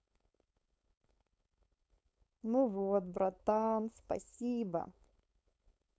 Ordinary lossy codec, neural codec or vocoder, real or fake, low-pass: none; codec, 16 kHz, 4.8 kbps, FACodec; fake; none